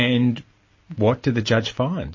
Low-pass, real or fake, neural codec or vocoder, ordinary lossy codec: 7.2 kHz; real; none; MP3, 32 kbps